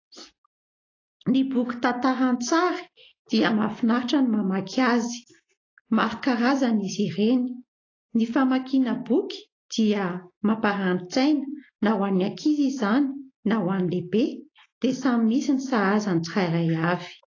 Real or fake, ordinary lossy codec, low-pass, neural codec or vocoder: real; AAC, 32 kbps; 7.2 kHz; none